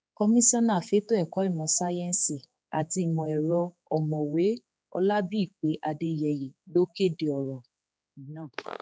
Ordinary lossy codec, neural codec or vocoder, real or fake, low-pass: none; codec, 16 kHz, 4 kbps, X-Codec, HuBERT features, trained on general audio; fake; none